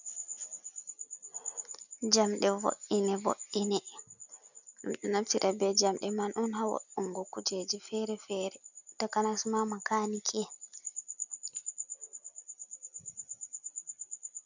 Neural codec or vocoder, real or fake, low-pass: none; real; 7.2 kHz